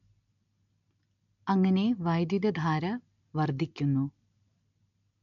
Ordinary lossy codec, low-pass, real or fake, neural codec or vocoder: none; 7.2 kHz; real; none